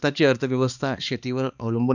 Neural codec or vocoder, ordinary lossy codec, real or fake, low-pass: codec, 16 kHz, 2 kbps, X-Codec, HuBERT features, trained on balanced general audio; none; fake; 7.2 kHz